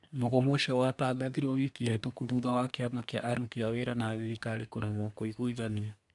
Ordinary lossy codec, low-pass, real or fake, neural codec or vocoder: none; 10.8 kHz; fake; codec, 24 kHz, 1 kbps, SNAC